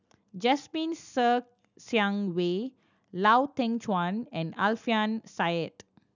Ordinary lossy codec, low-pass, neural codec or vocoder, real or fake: none; 7.2 kHz; none; real